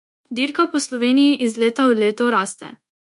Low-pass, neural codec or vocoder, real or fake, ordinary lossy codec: 10.8 kHz; codec, 24 kHz, 1.2 kbps, DualCodec; fake; MP3, 64 kbps